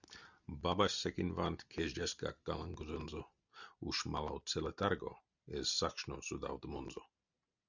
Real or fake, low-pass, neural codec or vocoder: real; 7.2 kHz; none